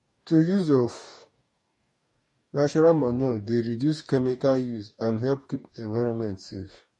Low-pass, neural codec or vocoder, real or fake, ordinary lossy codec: 10.8 kHz; codec, 44.1 kHz, 2.6 kbps, DAC; fake; MP3, 48 kbps